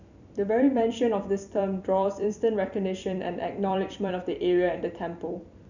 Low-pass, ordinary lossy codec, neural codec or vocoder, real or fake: 7.2 kHz; none; vocoder, 44.1 kHz, 128 mel bands every 256 samples, BigVGAN v2; fake